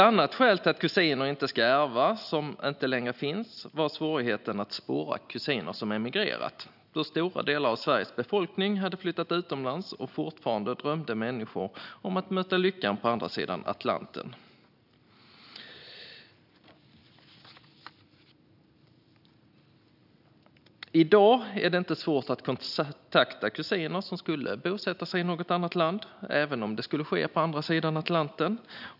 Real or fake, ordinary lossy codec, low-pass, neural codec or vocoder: real; none; 5.4 kHz; none